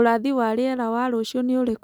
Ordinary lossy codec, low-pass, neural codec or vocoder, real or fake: none; none; none; real